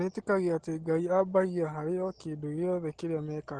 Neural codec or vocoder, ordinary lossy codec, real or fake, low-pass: none; Opus, 16 kbps; real; 9.9 kHz